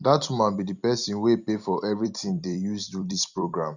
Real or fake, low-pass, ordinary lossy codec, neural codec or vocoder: fake; 7.2 kHz; none; vocoder, 44.1 kHz, 128 mel bands every 256 samples, BigVGAN v2